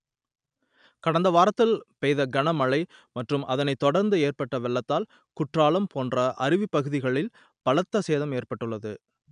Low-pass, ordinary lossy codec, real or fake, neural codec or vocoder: 9.9 kHz; none; real; none